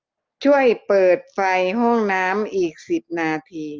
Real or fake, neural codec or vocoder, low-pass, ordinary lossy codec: real; none; 7.2 kHz; Opus, 32 kbps